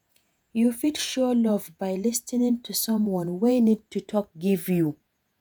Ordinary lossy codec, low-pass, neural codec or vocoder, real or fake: none; none; vocoder, 48 kHz, 128 mel bands, Vocos; fake